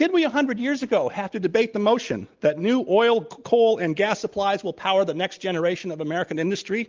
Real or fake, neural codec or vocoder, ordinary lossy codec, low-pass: real; none; Opus, 32 kbps; 7.2 kHz